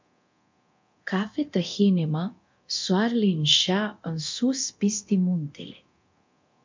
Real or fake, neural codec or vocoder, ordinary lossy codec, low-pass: fake; codec, 24 kHz, 0.9 kbps, DualCodec; MP3, 48 kbps; 7.2 kHz